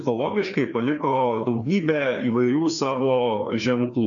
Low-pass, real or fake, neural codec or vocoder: 7.2 kHz; fake; codec, 16 kHz, 2 kbps, FreqCodec, larger model